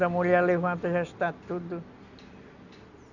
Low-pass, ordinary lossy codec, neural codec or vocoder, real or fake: 7.2 kHz; none; none; real